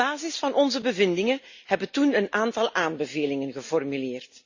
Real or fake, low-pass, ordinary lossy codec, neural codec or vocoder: real; 7.2 kHz; Opus, 64 kbps; none